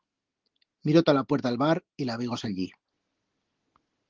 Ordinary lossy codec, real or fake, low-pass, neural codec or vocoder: Opus, 16 kbps; real; 7.2 kHz; none